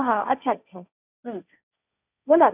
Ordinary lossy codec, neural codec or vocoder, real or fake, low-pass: none; codec, 16 kHz, 1.1 kbps, Voila-Tokenizer; fake; 3.6 kHz